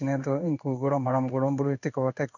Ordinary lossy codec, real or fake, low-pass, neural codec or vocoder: none; fake; 7.2 kHz; codec, 16 kHz in and 24 kHz out, 1 kbps, XY-Tokenizer